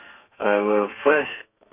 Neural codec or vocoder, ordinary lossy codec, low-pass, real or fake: codec, 32 kHz, 1.9 kbps, SNAC; none; 3.6 kHz; fake